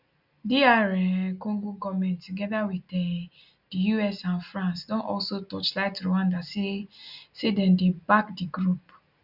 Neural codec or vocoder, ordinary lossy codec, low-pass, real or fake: none; none; 5.4 kHz; real